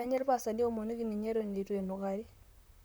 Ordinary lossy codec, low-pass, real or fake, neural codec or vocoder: none; none; fake; vocoder, 44.1 kHz, 128 mel bands, Pupu-Vocoder